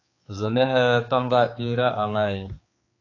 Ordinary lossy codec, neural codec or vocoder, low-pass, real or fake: AAC, 32 kbps; codec, 16 kHz, 4 kbps, X-Codec, HuBERT features, trained on balanced general audio; 7.2 kHz; fake